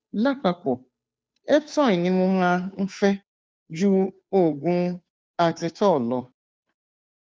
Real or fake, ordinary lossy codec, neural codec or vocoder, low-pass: fake; none; codec, 16 kHz, 2 kbps, FunCodec, trained on Chinese and English, 25 frames a second; none